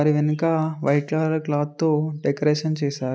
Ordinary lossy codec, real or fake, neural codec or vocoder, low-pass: none; real; none; none